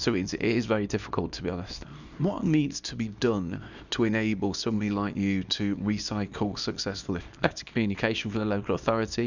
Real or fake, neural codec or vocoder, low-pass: fake; codec, 24 kHz, 0.9 kbps, WavTokenizer, small release; 7.2 kHz